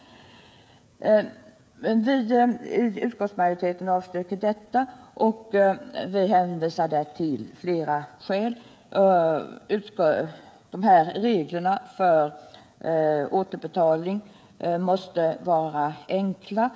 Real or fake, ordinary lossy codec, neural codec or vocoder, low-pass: fake; none; codec, 16 kHz, 16 kbps, FreqCodec, smaller model; none